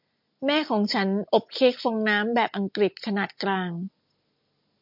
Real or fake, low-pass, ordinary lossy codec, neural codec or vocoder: real; 5.4 kHz; MP3, 48 kbps; none